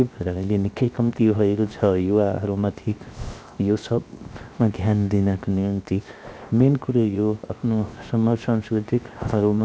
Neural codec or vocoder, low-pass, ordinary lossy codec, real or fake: codec, 16 kHz, 0.7 kbps, FocalCodec; none; none; fake